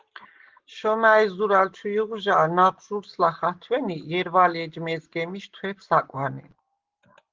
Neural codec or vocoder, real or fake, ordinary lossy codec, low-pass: none; real; Opus, 16 kbps; 7.2 kHz